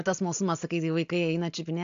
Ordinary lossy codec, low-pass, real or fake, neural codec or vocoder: AAC, 64 kbps; 7.2 kHz; real; none